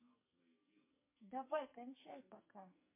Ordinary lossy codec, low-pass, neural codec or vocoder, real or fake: MP3, 24 kbps; 3.6 kHz; codec, 44.1 kHz, 2.6 kbps, SNAC; fake